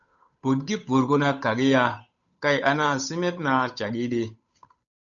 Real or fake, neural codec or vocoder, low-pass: fake; codec, 16 kHz, 8 kbps, FunCodec, trained on Chinese and English, 25 frames a second; 7.2 kHz